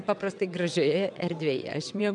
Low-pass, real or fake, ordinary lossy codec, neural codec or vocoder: 9.9 kHz; fake; MP3, 96 kbps; vocoder, 22.05 kHz, 80 mel bands, WaveNeXt